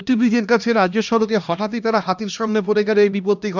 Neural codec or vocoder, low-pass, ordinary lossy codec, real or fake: codec, 16 kHz, 1 kbps, X-Codec, HuBERT features, trained on LibriSpeech; 7.2 kHz; none; fake